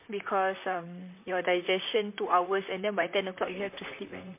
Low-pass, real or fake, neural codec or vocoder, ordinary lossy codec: 3.6 kHz; fake; vocoder, 44.1 kHz, 128 mel bands, Pupu-Vocoder; MP3, 24 kbps